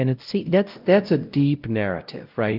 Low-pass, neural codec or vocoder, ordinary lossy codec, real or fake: 5.4 kHz; codec, 16 kHz, 0.5 kbps, X-Codec, WavLM features, trained on Multilingual LibriSpeech; Opus, 32 kbps; fake